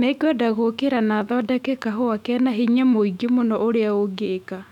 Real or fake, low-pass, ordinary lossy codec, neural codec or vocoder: fake; 19.8 kHz; none; autoencoder, 48 kHz, 128 numbers a frame, DAC-VAE, trained on Japanese speech